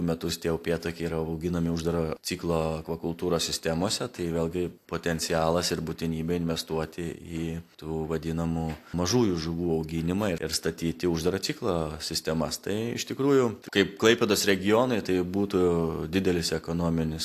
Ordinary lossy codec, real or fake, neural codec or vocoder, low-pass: AAC, 64 kbps; real; none; 14.4 kHz